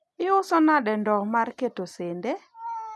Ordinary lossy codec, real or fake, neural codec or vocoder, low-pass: none; real; none; none